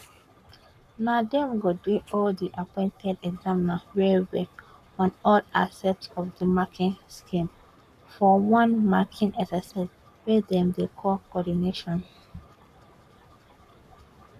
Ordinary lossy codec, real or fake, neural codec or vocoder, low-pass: none; fake; codec, 44.1 kHz, 7.8 kbps, Pupu-Codec; 14.4 kHz